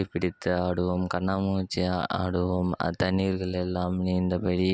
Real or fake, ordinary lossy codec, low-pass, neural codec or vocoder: real; none; none; none